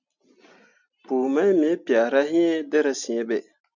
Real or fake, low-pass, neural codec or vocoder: real; 7.2 kHz; none